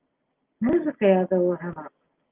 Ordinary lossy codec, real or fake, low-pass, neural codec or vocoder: Opus, 32 kbps; real; 3.6 kHz; none